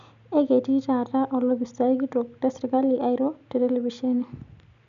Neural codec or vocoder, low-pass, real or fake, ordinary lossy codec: none; 7.2 kHz; real; none